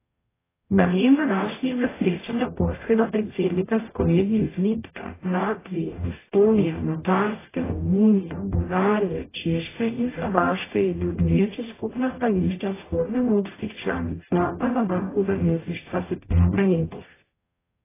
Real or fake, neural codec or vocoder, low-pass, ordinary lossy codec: fake; codec, 44.1 kHz, 0.9 kbps, DAC; 3.6 kHz; AAC, 16 kbps